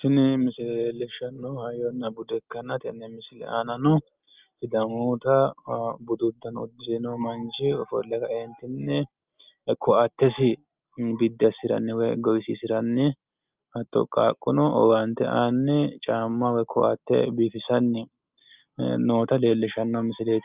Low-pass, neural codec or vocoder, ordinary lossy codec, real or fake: 3.6 kHz; none; Opus, 24 kbps; real